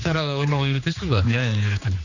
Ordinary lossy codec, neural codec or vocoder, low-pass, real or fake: none; codec, 16 kHz, 2 kbps, X-Codec, HuBERT features, trained on general audio; 7.2 kHz; fake